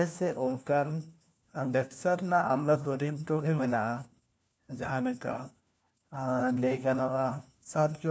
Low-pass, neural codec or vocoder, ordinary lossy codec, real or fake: none; codec, 16 kHz, 1 kbps, FunCodec, trained on LibriTTS, 50 frames a second; none; fake